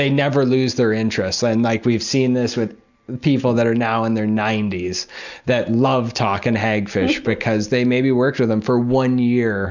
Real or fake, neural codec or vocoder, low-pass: real; none; 7.2 kHz